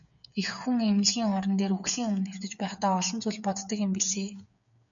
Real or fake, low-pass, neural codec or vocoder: fake; 7.2 kHz; codec, 16 kHz, 8 kbps, FreqCodec, smaller model